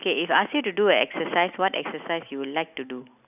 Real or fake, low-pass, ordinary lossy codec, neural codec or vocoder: real; 3.6 kHz; none; none